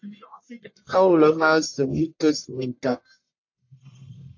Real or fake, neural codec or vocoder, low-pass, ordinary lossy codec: fake; codec, 44.1 kHz, 1.7 kbps, Pupu-Codec; 7.2 kHz; AAC, 48 kbps